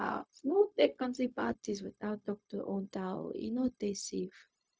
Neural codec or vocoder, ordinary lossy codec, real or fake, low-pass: codec, 16 kHz, 0.4 kbps, LongCat-Audio-Codec; none; fake; none